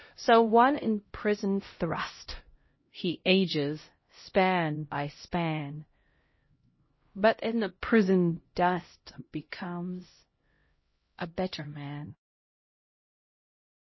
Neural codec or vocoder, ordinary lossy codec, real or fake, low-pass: codec, 16 kHz, 0.5 kbps, X-Codec, HuBERT features, trained on LibriSpeech; MP3, 24 kbps; fake; 7.2 kHz